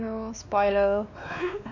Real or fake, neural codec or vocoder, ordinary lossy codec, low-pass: fake; codec, 16 kHz, 2 kbps, X-Codec, WavLM features, trained on Multilingual LibriSpeech; none; 7.2 kHz